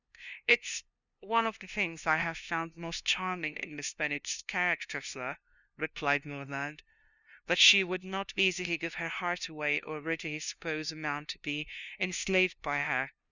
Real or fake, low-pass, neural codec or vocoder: fake; 7.2 kHz; codec, 16 kHz, 0.5 kbps, FunCodec, trained on LibriTTS, 25 frames a second